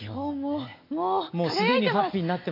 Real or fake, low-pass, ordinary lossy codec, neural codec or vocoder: real; 5.4 kHz; AAC, 32 kbps; none